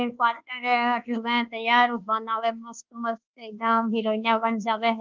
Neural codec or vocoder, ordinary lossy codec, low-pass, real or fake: codec, 24 kHz, 1.2 kbps, DualCodec; Opus, 32 kbps; 7.2 kHz; fake